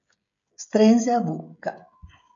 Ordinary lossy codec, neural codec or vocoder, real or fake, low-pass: AAC, 48 kbps; codec, 16 kHz, 16 kbps, FreqCodec, smaller model; fake; 7.2 kHz